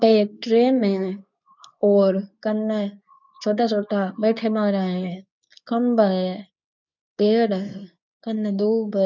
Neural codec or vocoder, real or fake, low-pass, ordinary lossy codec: codec, 24 kHz, 0.9 kbps, WavTokenizer, medium speech release version 2; fake; 7.2 kHz; none